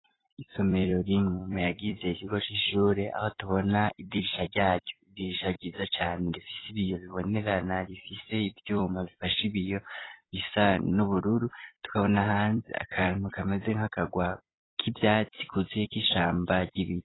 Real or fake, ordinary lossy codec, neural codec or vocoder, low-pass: fake; AAC, 16 kbps; vocoder, 44.1 kHz, 128 mel bands every 256 samples, BigVGAN v2; 7.2 kHz